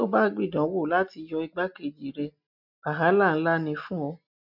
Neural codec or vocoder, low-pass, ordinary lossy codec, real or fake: none; 5.4 kHz; none; real